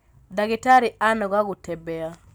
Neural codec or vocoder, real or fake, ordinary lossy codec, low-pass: none; real; none; none